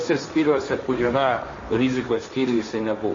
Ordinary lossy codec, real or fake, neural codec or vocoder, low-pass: MP3, 32 kbps; fake; codec, 16 kHz, 1.1 kbps, Voila-Tokenizer; 7.2 kHz